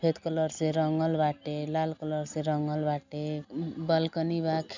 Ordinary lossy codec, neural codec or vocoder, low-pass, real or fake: none; none; 7.2 kHz; real